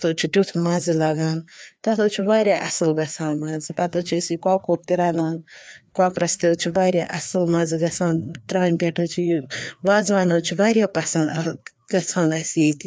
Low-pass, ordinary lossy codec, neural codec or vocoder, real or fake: none; none; codec, 16 kHz, 2 kbps, FreqCodec, larger model; fake